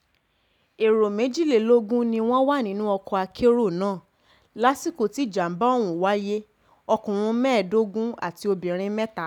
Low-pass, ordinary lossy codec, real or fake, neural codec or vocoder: 19.8 kHz; none; real; none